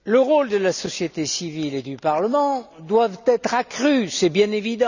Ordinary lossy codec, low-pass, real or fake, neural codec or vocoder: none; 7.2 kHz; real; none